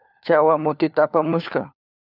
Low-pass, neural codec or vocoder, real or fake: 5.4 kHz; codec, 16 kHz, 4 kbps, FunCodec, trained on LibriTTS, 50 frames a second; fake